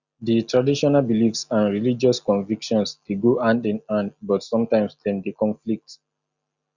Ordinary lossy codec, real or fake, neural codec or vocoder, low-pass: Opus, 64 kbps; real; none; 7.2 kHz